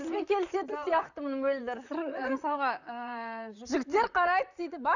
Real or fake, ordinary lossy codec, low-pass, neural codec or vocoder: fake; none; 7.2 kHz; vocoder, 44.1 kHz, 128 mel bands, Pupu-Vocoder